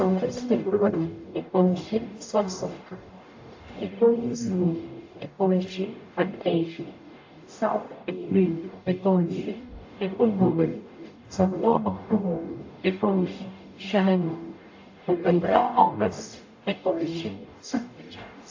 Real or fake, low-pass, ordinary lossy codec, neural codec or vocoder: fake; 7.2 kHz; AAC, 48 kbps; codec, 44.1 kHz, 0.9 kbps, DAC